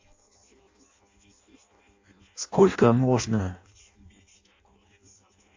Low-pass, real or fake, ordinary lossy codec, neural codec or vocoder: 7.2 kHz; fake; AAC, 48 kbps; codec, 16 kHz in and 24 kHz out, 0.6 kbps, FireRedTTS-2 codec